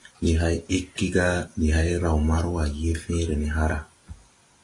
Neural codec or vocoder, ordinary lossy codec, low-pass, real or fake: none; AAC, 32 kbps; 10.8 kHz; real